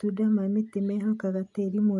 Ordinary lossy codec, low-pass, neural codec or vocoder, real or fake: none; 10.8 kHz; none; real